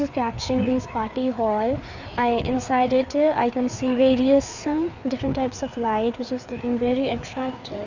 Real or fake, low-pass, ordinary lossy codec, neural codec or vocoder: fake; 7.2 kHz; none; codec, 16 kHz, 4 kbps, FreqCodec, larger model